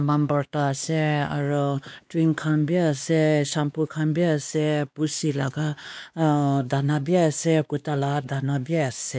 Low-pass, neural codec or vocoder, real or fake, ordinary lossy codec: none; codec, 16 kHz, 2 kbps, X-Codec, WavLM features, trained on Multilingual LibriSpeech; fake; none